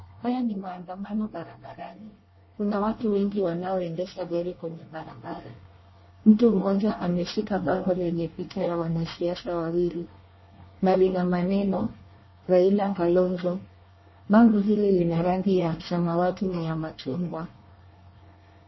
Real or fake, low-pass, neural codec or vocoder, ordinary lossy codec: fake; 7.2 kHz; codec, 24 kHz, 1 kbps, SNAC; MP3, 24 kbps